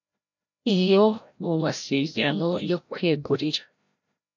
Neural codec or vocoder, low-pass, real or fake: codec, 16 kHz, 0.5 kbps, FreqCodec, larger model; 7.2 kHz; fake